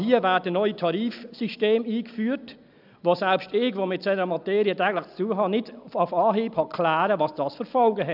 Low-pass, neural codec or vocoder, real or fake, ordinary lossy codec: 5.4 kHz; none; real; none